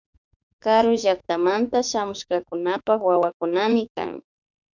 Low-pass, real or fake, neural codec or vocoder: 7.2 kHz; fake; autoencoder, 48 kHz, 32 numbers a frame, DAC-VAE, trained on Japanese speech